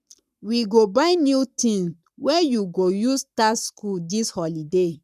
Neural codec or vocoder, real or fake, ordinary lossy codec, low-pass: codec, 44.1 kHz, 7.8 kbps, Pupu-Codec; fake; none; 14.4 kHz